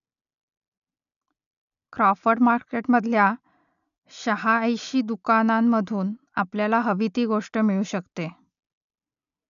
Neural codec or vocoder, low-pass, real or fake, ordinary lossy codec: none; 7.2 kHz; real; none